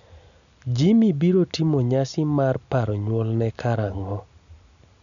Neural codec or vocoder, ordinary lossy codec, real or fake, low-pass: none; none; real; 7.2 kHz